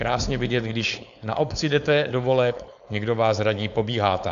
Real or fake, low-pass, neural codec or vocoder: fake; 7.2 kHz; codec, 16 kHz, 4.8 kbps, FACodec